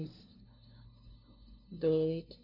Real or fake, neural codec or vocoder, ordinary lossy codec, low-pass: fake; codec, 16 kHz, 0.5 kbps, FunCodec, trained on LibriTTS, 25 frames a second; none; 5.4 kHz